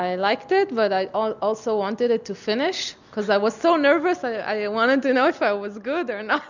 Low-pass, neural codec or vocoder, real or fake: 7.2 kHz; none; real